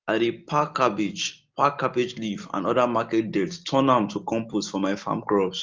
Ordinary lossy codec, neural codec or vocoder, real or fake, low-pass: Opus, 32 kbps; none; real; 7.2 kHz